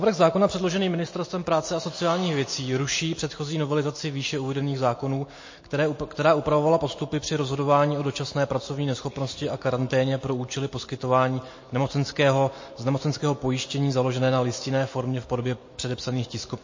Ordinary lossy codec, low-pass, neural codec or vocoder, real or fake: MP3, 32 kbps; 7.2 kHz; none; real